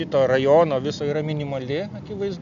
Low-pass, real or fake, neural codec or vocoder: 7.2 kHz; real; none